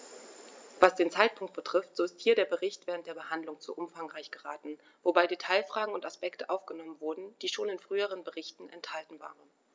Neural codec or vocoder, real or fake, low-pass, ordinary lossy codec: none; real; none; none